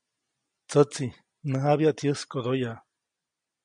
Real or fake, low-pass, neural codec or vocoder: real; 9.9 kHz; none